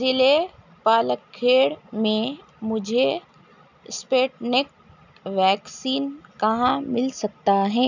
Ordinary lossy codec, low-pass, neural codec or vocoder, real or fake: none; 7.2 kHz; none; real